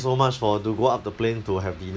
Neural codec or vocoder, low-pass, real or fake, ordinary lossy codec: none; none; real; none